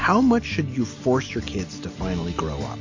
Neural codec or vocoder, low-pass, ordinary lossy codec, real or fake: none; 7.2 kHz; AAC, 48 kbps; real